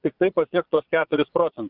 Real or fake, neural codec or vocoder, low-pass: fake; vocoder, 22.05 kHz, 80 mel bands, Vocos; 5.4 kHz